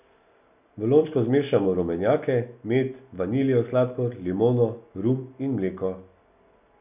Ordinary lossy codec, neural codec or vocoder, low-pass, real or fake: none; none; 3.6 kHz; real